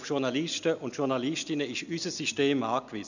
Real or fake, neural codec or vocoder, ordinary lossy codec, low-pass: real; none; none; 7.2 kHz